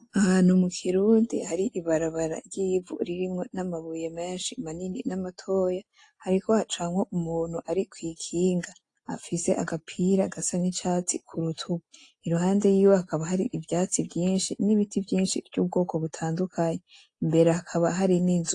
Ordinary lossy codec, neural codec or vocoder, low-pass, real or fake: AAC, 48 kbps; none; 10.8 kHz; real